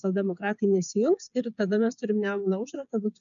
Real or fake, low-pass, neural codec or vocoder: fake; 7.2 kHz; codec, 16 kHz, 8 kbps, FreqCodec, smaller model